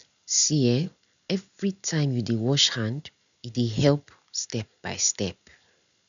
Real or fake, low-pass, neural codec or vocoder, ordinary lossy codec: real; 7.2 kHz; none; none